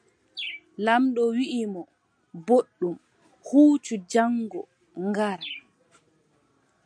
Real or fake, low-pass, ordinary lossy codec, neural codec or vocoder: real; 9.9 kHz; MP3, 96 kbps; none